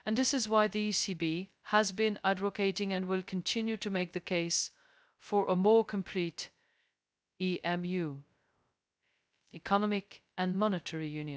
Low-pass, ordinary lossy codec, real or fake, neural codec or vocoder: none; none; fake; codec, 16 kHz, 0.2 kbps, FocalCodec